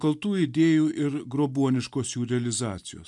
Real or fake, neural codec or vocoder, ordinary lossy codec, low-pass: real; none; MP3, 96 kbps; 10.8 kHz